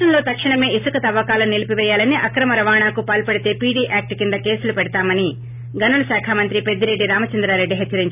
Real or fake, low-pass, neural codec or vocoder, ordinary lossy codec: real; 3.6 kHz; none; none